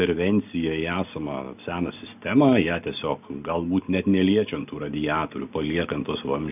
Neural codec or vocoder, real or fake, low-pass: none; real; 3.6 kHz